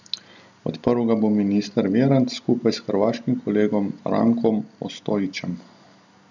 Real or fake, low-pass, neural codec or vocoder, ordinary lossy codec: real; 7.2 kHz; none; none